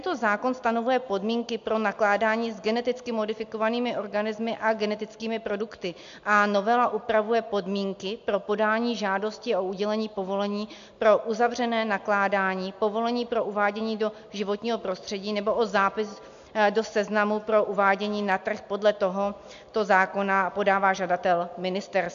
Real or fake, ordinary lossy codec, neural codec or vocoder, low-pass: real; AAC, 64 kbps; none; 7.2 kHz